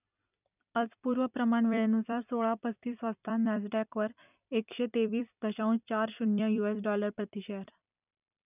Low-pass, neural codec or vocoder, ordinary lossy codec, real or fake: 3.6 kHz; vocoder, 44.1 kHz, 128 mel bands every 256 samples, BigVGAN v2; none; fake